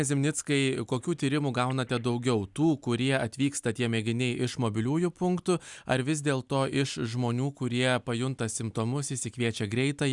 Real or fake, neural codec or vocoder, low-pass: real; none; 10.8 kHz